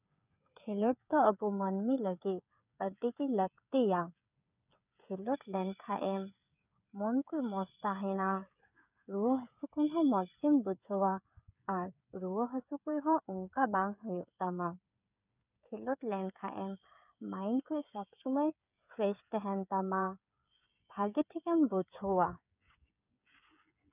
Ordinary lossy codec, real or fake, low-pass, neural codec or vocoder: none; fake; 3.6 kHz; codec, 16 kHz, 8 kbps, FreqCodec, smaller model